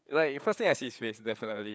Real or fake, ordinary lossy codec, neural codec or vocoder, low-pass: fake; none; codec, 16 kHz, 4 kbps, FreqCodec, larger model; none